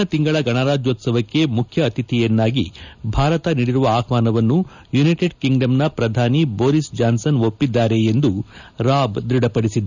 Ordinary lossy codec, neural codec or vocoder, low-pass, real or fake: none; none; 7.2 kHz; real